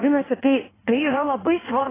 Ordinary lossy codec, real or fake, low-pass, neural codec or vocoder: AAC, 16 kbps; fake; 3.6 kHz; codec, 16 kHz in and 24 kHz out, 1.1 kbps, FireRedTTS-2 codec